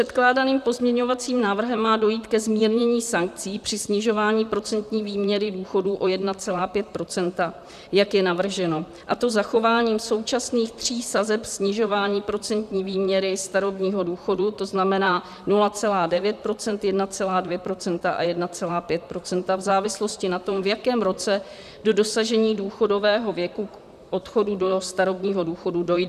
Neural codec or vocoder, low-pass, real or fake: vocoder, 44.1 kHz, 128 mel bands, Pupu-Vocoder; 14.4 kHz; fake